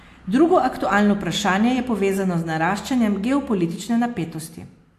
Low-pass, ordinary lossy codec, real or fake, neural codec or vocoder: 14.4 kHz; AAC, 64 kbps; real; none